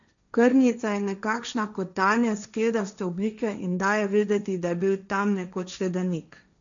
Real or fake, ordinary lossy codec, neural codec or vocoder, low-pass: fake; none; codec, 16 kHz, 1.1 kbps, Voila-Tokenizer; 7.2 kHz